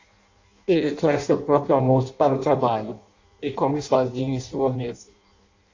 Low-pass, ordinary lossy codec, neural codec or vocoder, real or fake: 7.2 kHz; AAC, 48 kbps; codec, 16 kHz in and 24 kHz out, 0.6 kbps, FireRedTTS-2 codec; fake